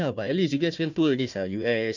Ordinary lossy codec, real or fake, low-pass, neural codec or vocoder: none; fake; 7.2 kHz; codec, 16 kHz, 1 kbps, FunCodec, trained on Chinese and English, 50 frames a second